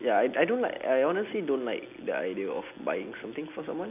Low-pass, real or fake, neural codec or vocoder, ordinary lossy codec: 3.6 kHz; real; none; none